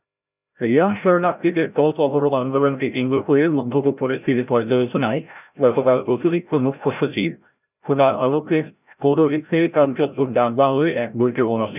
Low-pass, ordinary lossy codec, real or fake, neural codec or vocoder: 3.6 kHz; none; fake; codec, 16 kHz, 0.5 kbps, FreqCodec, larger model